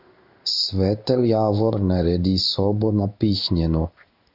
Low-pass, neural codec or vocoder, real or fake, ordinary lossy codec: 5.4 kHz; codec, 16 kHz in and 24 kHz out, 1 kbps, XY-Tokenizer; fake; AAC, 48 kbps